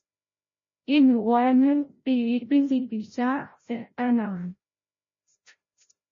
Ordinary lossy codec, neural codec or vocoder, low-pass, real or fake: MP3, 32 kbps; codec, 16 kHz, 0.5 kbps, FreqCodec, larger model; 7.2 kHz; fake